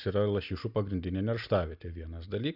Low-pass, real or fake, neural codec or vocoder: 5.4 kHz; real; none